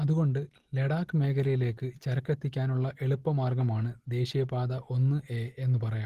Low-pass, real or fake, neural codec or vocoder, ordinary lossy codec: 14.4 kHz; real; none; Opus, 16 kbps